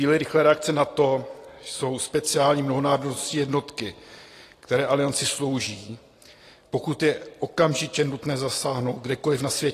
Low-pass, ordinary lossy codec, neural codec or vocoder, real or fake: 14.4 kHz; AAC, 48 kbps; none; real